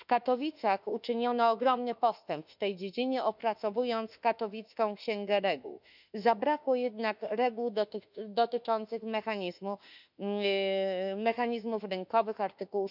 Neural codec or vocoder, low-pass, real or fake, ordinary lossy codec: autoencoder, 48 kHz, 32 numbers a frame, DAC-VAE, trained on Japanese speech; 5.4 kHz; fake; none